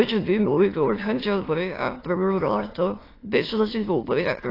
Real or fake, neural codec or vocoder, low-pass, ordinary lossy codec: fake; autoencoder, 22.05 kHz, a latent of 192 numbers a frame, VITS, trained on many speakers; 5.4 kHz; AAC, 24 kbps